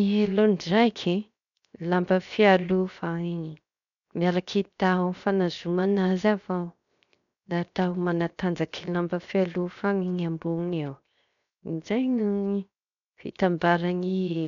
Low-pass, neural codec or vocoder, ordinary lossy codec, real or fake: 7.2 kHz; codec, 16 kHz, 0.7 kbps, FocalCodec; none; fake